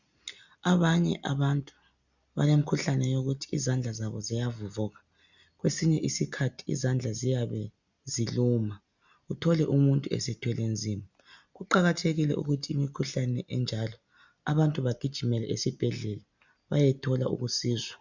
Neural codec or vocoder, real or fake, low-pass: none; real; 7.2 kHz